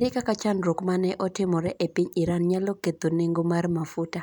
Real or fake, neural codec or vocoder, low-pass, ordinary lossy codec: real; none; 19.8 kHz; none